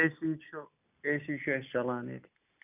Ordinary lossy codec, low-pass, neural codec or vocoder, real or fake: none; 3.6 kHz; none; real